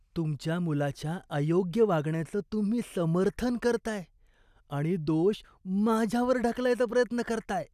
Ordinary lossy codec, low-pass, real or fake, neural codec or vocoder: none; 14.4 kHz; real; none